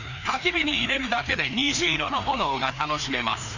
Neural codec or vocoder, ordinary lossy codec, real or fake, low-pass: codec, 16 kHz, 2 kbps, FreqCodec, larger model; none; fake; 7.2 kHz